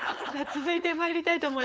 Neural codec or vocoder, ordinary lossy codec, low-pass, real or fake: codec, 16 kHz, 4.8 kbps, FACodec; none; none; fake